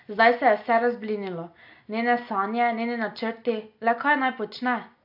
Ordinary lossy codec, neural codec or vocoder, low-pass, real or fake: AAC, 48 kbps; none; 5.4 kHz; real